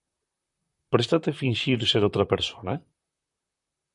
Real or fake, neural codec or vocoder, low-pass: fake; vocoder, 44.1 kHz, 128 mel bands, Pupu-Vocoder; 10.8 kHz